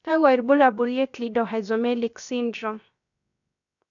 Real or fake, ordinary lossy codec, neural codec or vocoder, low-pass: fake; none; codec, 16 kHz, 0.7 kbps, FocalCodec; 7.2 kHz